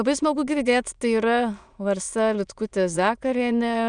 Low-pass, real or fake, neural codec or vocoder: 9.9 kHz; fake; autoencoder, 22.05 kHz, a latent of 192 numbers a frame, VITS, trained on many speakers